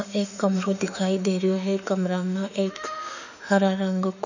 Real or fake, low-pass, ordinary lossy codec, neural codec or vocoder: fake; 7.2 kHz; none; autoencoder, 48 kHz, 32 numbers a frame, DAC-VAE, trained on Japanese speech